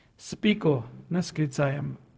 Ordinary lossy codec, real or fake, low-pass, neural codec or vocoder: none; fake; none; codec, 16 kHz, 0.4 kbps, LongCat-Audio-Codec